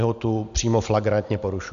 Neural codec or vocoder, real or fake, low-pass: none; real; 7.2 kHz